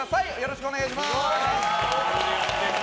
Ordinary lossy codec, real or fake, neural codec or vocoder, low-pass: none; real; none; none